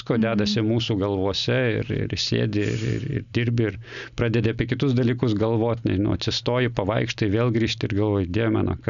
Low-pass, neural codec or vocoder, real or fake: 7.2 kHz; none; real